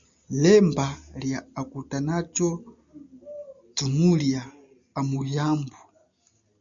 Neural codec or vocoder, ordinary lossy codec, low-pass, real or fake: none; MP3, 64 kbps; 7.2 kHz; real